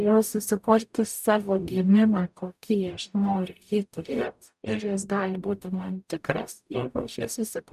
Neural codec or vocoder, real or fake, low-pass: codec, 44.1 kHz, 0.9 kbps, DAC; fake; 14.4 kHz